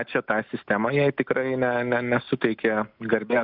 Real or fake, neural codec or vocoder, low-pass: real; none; 5.4 kHz